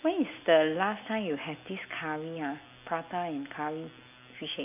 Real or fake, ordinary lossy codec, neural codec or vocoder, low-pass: real; none; none; 3.6 kHz